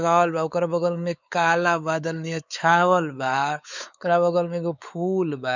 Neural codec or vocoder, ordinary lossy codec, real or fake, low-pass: codec, 16 kHz, 4 kbps, X-Codec, WavLM features, trained on Multilingual LibriSpeech; none; fake; 7.2 kHz